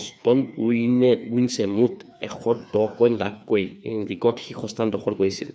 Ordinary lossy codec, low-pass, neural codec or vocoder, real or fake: none; none; codec, 16 kHz, 2 kbps, FreqCodec, larger model; fake